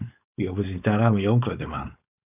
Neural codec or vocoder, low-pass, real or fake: codec, 16 kHz, 4.8 kbps, FACodec; 3.6 kHz; fake